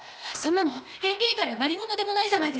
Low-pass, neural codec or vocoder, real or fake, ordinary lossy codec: none; codec, 16 kHz, 0.8 kbps, ZipCodec; fake; none